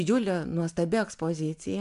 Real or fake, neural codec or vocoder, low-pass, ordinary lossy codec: real; none; 10.8 kHz; AAC, 96 kbps